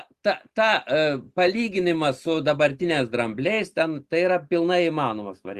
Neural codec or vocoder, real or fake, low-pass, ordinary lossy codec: none; real; 14.4 kHz; Opus, 32 kbps